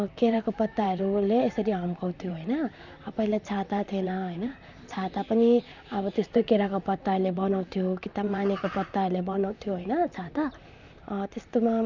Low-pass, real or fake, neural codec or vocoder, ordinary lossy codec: 7.2 kHz; fake; vocoder, 44.1 kHz, 128 mel bands, Pupu-Vocoder; none